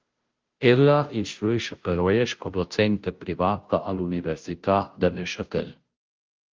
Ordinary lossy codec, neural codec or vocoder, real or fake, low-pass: Opus, 32 kbps; codec, 16 kHz, 0.5 kbps, FunCodec, trained on Chinese and English, 25 frames a second; fake; 7.2 kHz